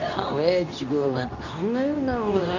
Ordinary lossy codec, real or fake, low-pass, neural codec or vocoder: none; fake; 7.2 kHz; codec, 24 kHz, 0.9 kbps, WavTokenizer, medium speech release version 2